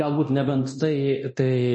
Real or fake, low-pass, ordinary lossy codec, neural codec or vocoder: fake; 9.9 kHz; MP3, 32 kbps; codec, 24 kHz, 0.9 kbps, DualCodec